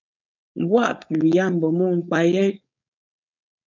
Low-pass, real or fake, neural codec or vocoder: 7.2 kHz; fake; codec, 16 kHz, 4.8 kbps, FACodec